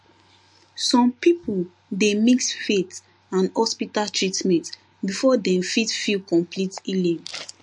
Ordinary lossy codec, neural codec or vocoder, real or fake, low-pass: MP3, 48 kbps; none; real; 10.8 kHz